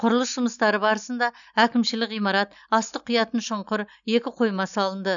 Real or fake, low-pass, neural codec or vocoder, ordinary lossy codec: real; 7.2 kHz; none; none